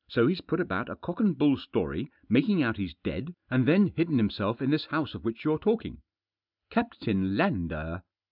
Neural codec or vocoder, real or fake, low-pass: none; real; 5.4 kHz